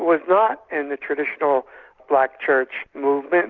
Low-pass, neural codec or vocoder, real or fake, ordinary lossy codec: 7.2 kHz; none; real; Opus, 64 kbps